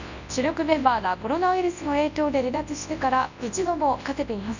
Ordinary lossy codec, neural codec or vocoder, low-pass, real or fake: none; codec, 24 kHz, 0.9 kbps, WavTokenizer, large speech release; 7.2 kHz; fake